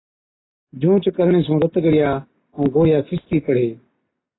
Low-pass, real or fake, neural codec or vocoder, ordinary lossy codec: 7.2 kHz; real; none; AAC, 16 kbps